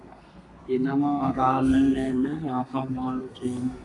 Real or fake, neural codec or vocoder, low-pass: fake; codec, 32 kHz, 1.9 kbps, SNAC; 10.8 kHz